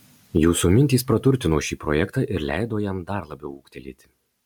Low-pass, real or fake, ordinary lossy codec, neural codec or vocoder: 19.8 kHz; real; MP3, 96 kbps; none